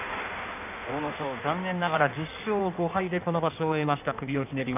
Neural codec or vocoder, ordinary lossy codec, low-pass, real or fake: codec, 16 kHz in and 24 kHz out, 1.1 kbps, FireRedTTS-2 codec; none; 3.6 kHz; fake